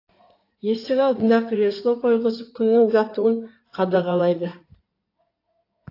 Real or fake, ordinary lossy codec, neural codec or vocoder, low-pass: fake; AAC, 32 kbps; codec, 16 kHz in and 24 kHz out, 2.2 kbps, FireRedTTS-2 codec; 5.4 kHz